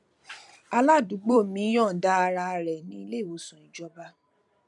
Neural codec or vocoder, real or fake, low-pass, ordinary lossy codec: vocoder, 44.1 kHz, 128 mel bands every 256 samples, BigVGAN v2; fake; 10.8 kHz; none